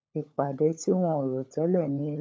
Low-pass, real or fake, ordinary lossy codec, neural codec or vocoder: none; fake; none; codec, 16 kHz, 16 kbps, FunCodec, trained on LibriTTS, 50 frames a second